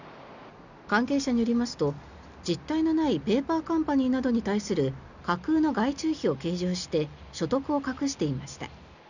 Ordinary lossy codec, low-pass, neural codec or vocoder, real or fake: none; 7.2 kHz; none; real